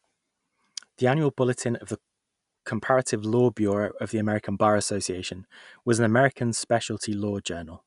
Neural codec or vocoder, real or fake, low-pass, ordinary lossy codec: none; real; 10.8 kHz; none